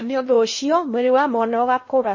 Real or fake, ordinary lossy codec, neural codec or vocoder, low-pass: fake; MP3, 32 kbps; codec, 16 kHz in and 24 kHz out, 0.6 kbps, FocalCodec, streaming, 2048 codes; 7.2 kHz